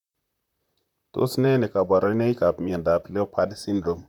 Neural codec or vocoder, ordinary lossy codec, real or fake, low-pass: vocoder, 44.1 kHz, 128 mel bands, Pupu-Vocoder; none; fake; 19.8 kHz